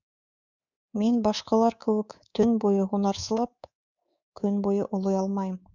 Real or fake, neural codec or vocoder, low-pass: fake; codec, 24 kHz, 3.1 kbps, DualCodec; 7.2 kHz